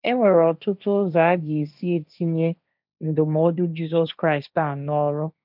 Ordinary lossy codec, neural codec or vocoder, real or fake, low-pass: none; codec, 16 kHz, 1.1 kbps, Voila-Tokenizer; fake; 5.4 kHz